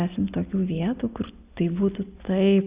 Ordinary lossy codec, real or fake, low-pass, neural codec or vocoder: Opus, 64 kbps; real; 3.6 kHz; none